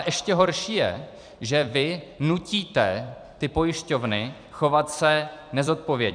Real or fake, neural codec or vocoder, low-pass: fake; vocoder, 44.1 kHz, 128 mel bands every 256 samples, BigVGAN v2; 9.9 kHz